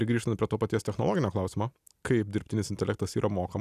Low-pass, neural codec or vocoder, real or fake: 14.4 kHz; none; real